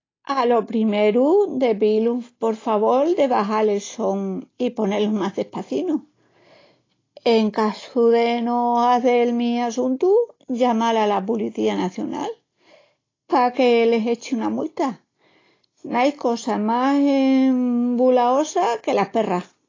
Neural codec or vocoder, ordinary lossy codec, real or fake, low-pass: none; AAC, 32 kbps; real; 7.2 kHz